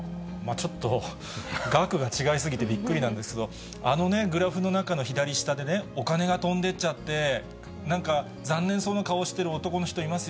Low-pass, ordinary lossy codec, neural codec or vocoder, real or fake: none; none; none; real